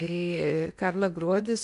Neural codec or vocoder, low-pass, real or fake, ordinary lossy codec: codec, 16 kHz in and 24 kHz out, 0.8 kbps, FocalCodec, streaming, 65536 codes; 10.8 kHz; fake; MP3, 64 kbps